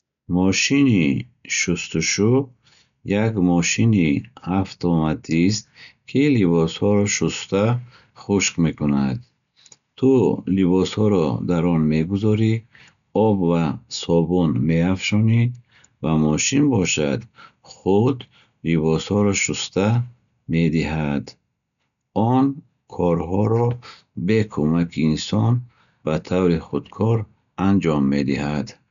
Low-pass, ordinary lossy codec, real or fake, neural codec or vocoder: 7.2 kHz; none; real; none